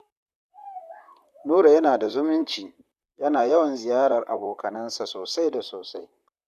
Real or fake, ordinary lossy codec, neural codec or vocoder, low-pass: fake; none; vocoder, 44.1 kHz, 128 mel bands, Pupu-Vocoder; 14.4 kHz